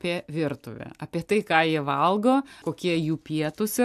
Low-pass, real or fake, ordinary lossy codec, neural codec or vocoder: 14.4 kHz; real; AAC, 96 kbps; none